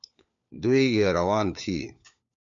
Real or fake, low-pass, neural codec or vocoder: fake; 7.2 kHz; codec, 16 kHz, 4 kbps, FunCodec, trained on LibriTTS, 50 frames a second